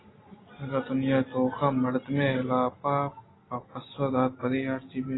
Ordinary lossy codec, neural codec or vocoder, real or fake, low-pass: AAC, 16 kbps; none; real; 7.2 kHz